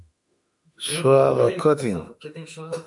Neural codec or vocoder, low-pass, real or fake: autoencoder, 48 kHz, 32 numbers a frame, DAC-VAE, trained on Japanese speech; 10.8 kHz; fake